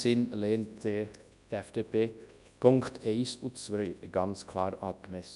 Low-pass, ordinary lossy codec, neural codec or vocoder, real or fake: 10.8 kHz; none; codec, 24 kHz, 0.9 kbps, WavTokenizer, large speech release; fake